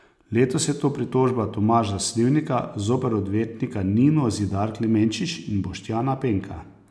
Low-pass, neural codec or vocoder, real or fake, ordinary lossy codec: none; none; real; none